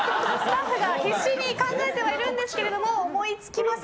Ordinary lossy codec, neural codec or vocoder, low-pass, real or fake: none; none; none; real